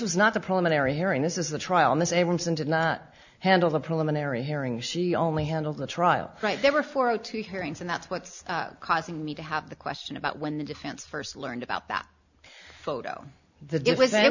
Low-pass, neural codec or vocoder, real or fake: 7.2 kHz; none; real